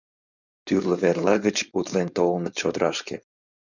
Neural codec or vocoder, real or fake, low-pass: codec, 16 kHz, 4.8 kbps, FACodec; fake; 7.2 kHz